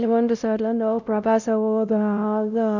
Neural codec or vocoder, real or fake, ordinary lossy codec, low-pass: codec, 16 kHz, 0.5 kbps, X-Codec, WavLM features, trained on Multilingual LibriSpeech; fake; none; 7.2 kHz